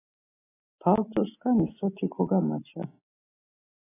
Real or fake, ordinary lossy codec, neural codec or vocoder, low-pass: real; AAC, 16 kbps; none; 3.6 kHz